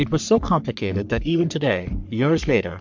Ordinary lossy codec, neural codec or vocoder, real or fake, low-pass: MP3, 64 kbps; codec, 44.1 kHz, 3.4 kbps, Pupu-Codec; fake; 7.2 kHz